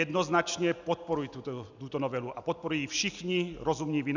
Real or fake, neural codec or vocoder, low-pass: real; none; 7.2 kHz